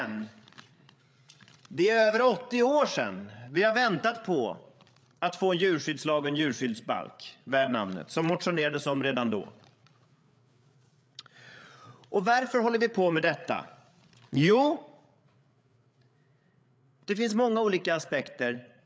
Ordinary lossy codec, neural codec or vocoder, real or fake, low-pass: none; codec, 16 kHz, 8 kbps, FreqCodec, larger model; fake; none